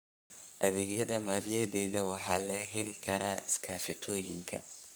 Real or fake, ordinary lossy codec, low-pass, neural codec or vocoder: fake; none; none; codec, 44.1 kHz, 3.4 kbps, Pupu-Codec